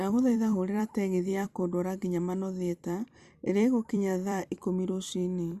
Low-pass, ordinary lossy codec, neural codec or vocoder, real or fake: 14.4 kHz; Opus, 64 kbps; vocoder, 44.1 kHz, 128 mel bands every 256 samples, BigVGAN v2; fake